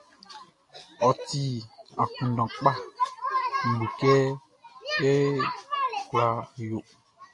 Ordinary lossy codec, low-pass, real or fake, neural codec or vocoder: AAC, 48 kbps; 10.8 kHz; real; none